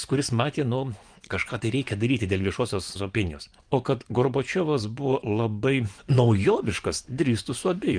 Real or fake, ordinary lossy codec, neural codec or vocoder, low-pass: fake; Opus, 32 kbps; vocoder, 24 kHz, 100 mel bands, Vocos; 9.9 kHz